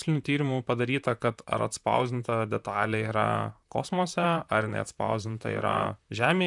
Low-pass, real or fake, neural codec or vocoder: 10.8 kHz; fake; vocoder, 44.1 kHz, 128 mel bands, Pupu-Vocoder